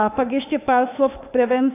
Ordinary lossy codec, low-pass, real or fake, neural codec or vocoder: AAC, 24 kbps; 3.6 kHz; fake; autoencoder, 48 kHz, 32 numbers a frame, DAC-VAE, trained on Japanese speech